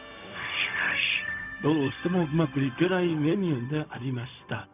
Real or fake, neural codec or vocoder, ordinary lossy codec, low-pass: fake; codec, 16 kHz, 0.4 kbps, LongCat-Audio-Codec; none; 3.6 kHz